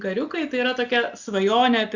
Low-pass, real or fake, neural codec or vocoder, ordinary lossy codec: 7.2 kHz; real; none; Opus, 64 kbps